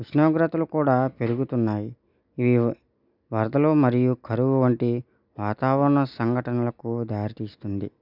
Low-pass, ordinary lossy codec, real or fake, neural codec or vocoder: 5.4 kHz; none; real; none